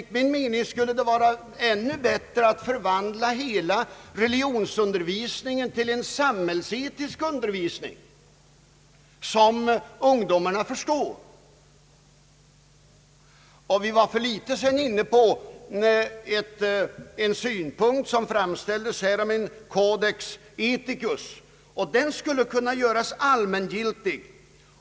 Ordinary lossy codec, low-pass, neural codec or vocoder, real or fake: none; none; none; real